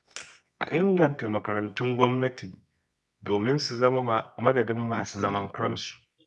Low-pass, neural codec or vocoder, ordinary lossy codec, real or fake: none; codec, 24 kHz, 0.9 kbps, WavTokenizer, medium music audio release; none; fake